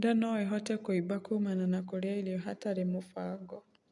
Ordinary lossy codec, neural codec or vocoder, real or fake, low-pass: none; none; real; 10.8 kHz